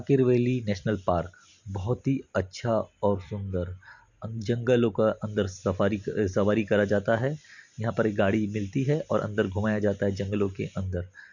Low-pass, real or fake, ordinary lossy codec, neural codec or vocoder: 7.2 kHz; real; none; none